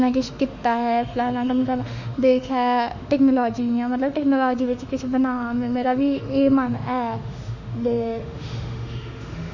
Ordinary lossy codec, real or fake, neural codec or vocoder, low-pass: none; fake; autoencoder, 48 kHz, 32 numbers a frame, DAC-VAE, trained on Japanese speech; 7.2 kHz